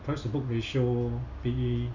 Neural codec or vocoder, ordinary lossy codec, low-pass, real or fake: none; none; 7.2 kHz; real